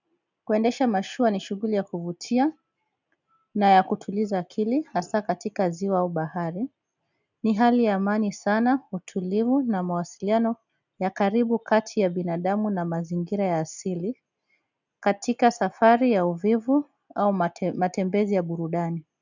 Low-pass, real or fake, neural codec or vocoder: 7.2 kHz; real; none